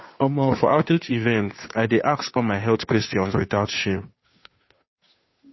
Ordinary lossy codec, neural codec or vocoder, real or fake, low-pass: MP3, 24 kbps; codec, 16 kHz, 2 kbps, FunCodec, trained on Chinese and English, 25 frames a second; fake; 7.2 kHz